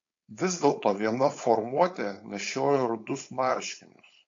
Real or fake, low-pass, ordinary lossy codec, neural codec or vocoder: fake; 7.2 kHz; AAC, 48 kbps; codec, 16 kHz, 4.8 kbps, FACodec